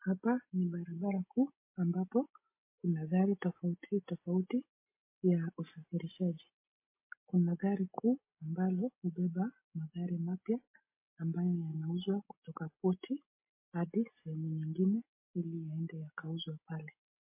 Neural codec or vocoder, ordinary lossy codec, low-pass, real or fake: none; MP3, 24 kbps; 3.6 kHz; real